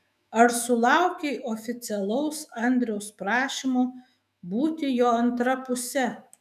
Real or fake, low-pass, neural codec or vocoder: fake; 14.4 kHz; autoencoder, 48 kHz, 128 numbers a frame, DAC-VAE, trained on Japanese speech